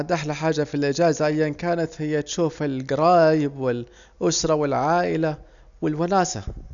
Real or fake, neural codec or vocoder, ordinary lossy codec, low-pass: real; none; MP3, 96 kbps; 7.2 kHz